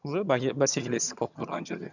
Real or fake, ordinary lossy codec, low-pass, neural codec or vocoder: fake; none; 7.2 kHz; vocoder, 22.05 kHz, 80 mel bands, HiFi-GAN